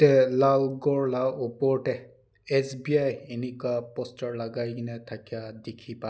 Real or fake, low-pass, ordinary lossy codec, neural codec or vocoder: real; none; none; none